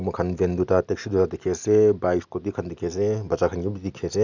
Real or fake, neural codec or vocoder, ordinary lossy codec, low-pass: real; none; none; 7.2 kHz